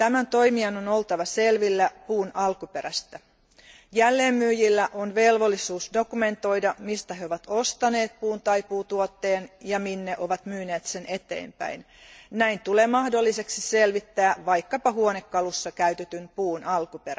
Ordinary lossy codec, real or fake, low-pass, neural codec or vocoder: none; real; none; none